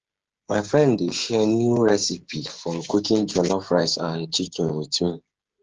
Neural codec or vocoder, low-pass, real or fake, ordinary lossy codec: codec, 16 kHz, 16 kbps, FreqCodec, smaller model; 7.2 kHz; fake; Opus, 16 kbps